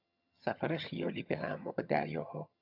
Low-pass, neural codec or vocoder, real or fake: 5.4 kHz; vocoder, 22.05 kHz, 80 mel bands, HiFi-GAN; fake